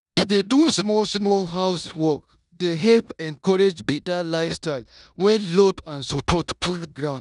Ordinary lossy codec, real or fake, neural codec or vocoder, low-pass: none; fake; codec, 16 kHz in and 24 kHz out, 0.9 kbps, LongCat-Audio-Codec, four codebook decoder; 10.8 kHz